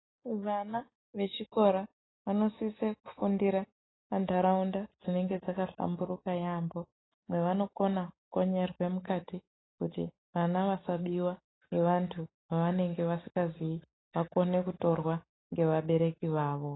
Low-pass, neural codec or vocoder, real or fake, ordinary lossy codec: 7.2 kHz; none; real; AAC, 16 kbps